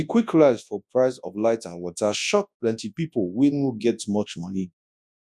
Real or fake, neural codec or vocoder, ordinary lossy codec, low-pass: fake; codec, 24 kHz, 0.9 kbps, WavTokenizer, large speech release; none; none